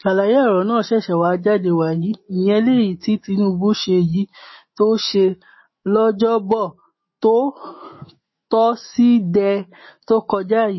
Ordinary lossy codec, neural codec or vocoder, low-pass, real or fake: MP3, 24 kbps; autoencoder, 48 kHz, 128 numbers a frame, DAC-VAE, trained on Japanese speech; 7.2 kHz; fake